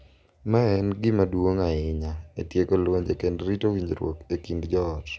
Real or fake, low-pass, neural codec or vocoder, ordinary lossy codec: real; none; none; none